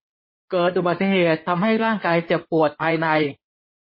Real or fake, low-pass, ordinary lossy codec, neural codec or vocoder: fake; 5.4 kHz; MP3, 24 kbps; codec, 16 kHz in and 24 kHz out, 2.2 kbps, FireRedTTS-2 codec